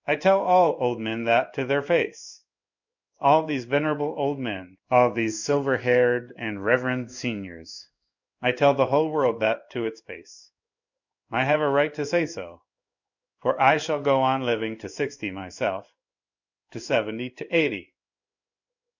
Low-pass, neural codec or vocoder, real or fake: 7.2 kHz; codec, 16 kHz in and 24 kHz out, 1 kbps, XY-Tokenizer; fake